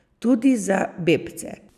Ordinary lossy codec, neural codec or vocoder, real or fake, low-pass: none; none; real; 14.4 kHz